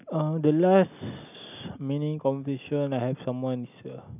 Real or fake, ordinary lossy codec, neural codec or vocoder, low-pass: real; none; none; 3.6 kHz